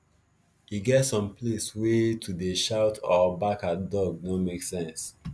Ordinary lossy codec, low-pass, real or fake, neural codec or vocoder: none; none; real; none